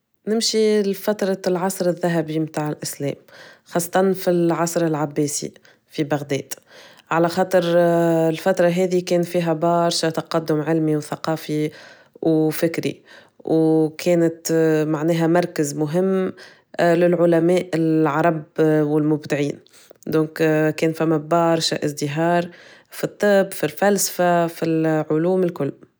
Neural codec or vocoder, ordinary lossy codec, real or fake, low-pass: none; none; real; none